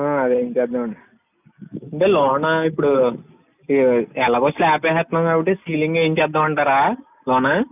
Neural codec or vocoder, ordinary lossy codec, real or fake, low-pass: none; none; real; 3.6 kHz